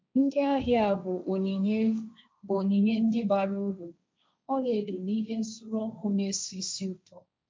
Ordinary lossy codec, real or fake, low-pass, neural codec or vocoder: none; fake; none; codec, 16 kHz, 1.1 kbps, Voila-Tokenizer